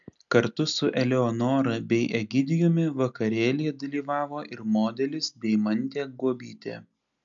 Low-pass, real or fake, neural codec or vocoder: 7.2 kHz; real; none